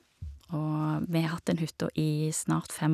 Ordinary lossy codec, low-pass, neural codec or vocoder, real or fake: none; 14.4 kHz; none; real